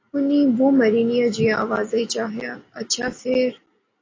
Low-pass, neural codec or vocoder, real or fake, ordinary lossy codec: 7.2 kHz; none; real; AAC, 32 kbps